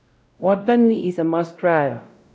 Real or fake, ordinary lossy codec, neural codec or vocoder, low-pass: fake; none; codec, 16 kHz, 0.5 kbps, X-Codec, WavLM features, trained on Multilingual LibriSpeech; none